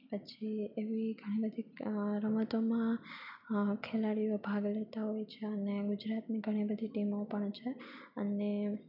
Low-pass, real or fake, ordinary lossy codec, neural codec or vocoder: 5.4 kHz; real; none; none